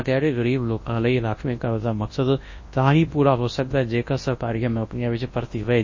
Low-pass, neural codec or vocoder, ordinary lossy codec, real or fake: 7.2 kHz; codec, 24 kHz, 0.9 kbps, WavTokenizer, large speech release; MP3, 32 kbps; fake